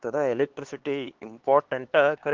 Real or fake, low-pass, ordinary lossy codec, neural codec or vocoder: fake; 7.2 kHz; Opus, 16 kbps; codec, 16 kHz, 2 kbps, X-Codec, HuBERT features, trained on LibriSpeech